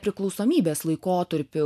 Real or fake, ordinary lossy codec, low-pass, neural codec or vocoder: real; MP3, 96 kbps; 14.4 kHz; none